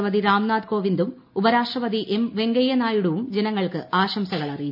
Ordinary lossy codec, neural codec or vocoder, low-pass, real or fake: none; none; 5.4 kHz; real